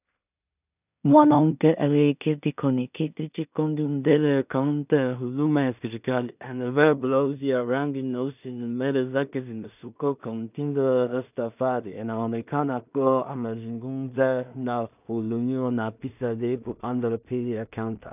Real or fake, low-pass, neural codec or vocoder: fake; 3.6 kHz; codec, 16 kHz in and 24 kHz out, 0.4 kbps, LongCat-Audio-Codec, two codebook decoder